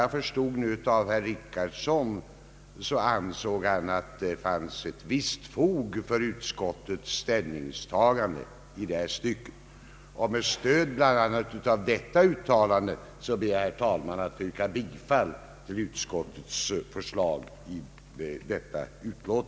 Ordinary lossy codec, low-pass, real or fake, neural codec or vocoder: none; none; real; none